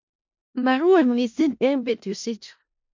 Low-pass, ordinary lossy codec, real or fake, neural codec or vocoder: 7.2 kHz; MP3, 48 kbps; fake; codec, 16 kHz in and 24 kHz out, 0.4 kbps, LongCat-Audio-Codec, four codebook decoder